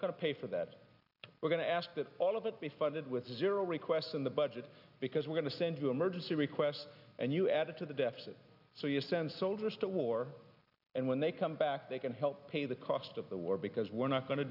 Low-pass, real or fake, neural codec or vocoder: 5.4 kHz; real; none